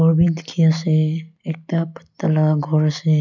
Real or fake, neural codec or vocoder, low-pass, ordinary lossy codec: real; none; 7.2 kHz; none